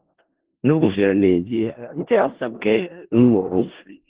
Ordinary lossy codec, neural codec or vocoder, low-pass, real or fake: Opus, 16 kbps; codec, 16 kHz in and 24 kHz out, 0.4 kbps, LongCat-Audio-Codec, four codebook decoder; 3.6 kHz; fake